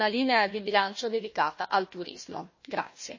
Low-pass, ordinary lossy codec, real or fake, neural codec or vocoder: 7.2 kHz; MP3, 32 kbps; fake; codec, 16 kHz, 1 kbps, FunCodec, trained on Chinese and English, 50 frames a second